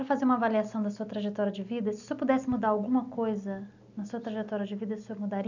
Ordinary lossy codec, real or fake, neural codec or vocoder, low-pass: none; real; none; 7.2 kHz